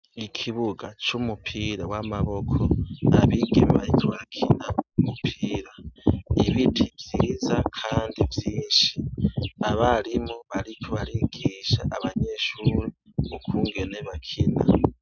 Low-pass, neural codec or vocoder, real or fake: 7.2 kHz; none; real